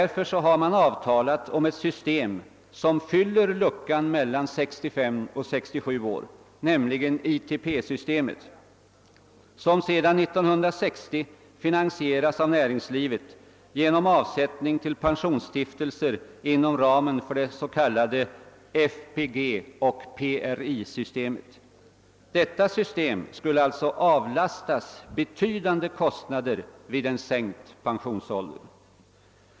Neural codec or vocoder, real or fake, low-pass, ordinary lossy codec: none; real; none; none